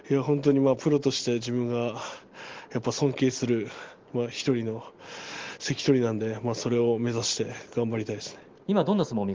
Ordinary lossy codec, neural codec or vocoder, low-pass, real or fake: Opus, 16 kbps; none; 7.2 kHz; real